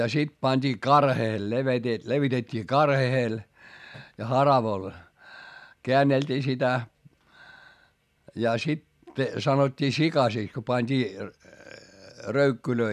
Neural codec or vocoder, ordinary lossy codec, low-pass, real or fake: none; none; 14.4 kHz; real